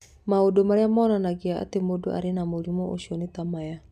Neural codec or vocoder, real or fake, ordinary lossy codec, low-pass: none; real; none; 14.4 kHz